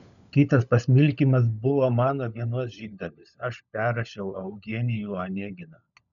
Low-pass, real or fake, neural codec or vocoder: 7.2 kHz; fake; codec, 16 kHz, 16 kbps, FunCodec, trained on LibriTTS, 50 frames a second